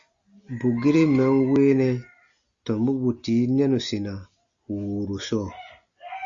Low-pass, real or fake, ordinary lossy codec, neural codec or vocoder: 7.2 kHz; real; Opus, 64 kbps; none